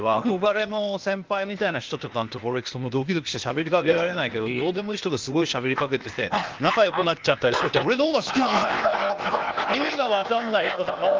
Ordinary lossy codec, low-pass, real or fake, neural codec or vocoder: Opus, 32 kbps; 7.2 kHz; fake; codec, 16 kHz, 0.8 kbps, ZipCodec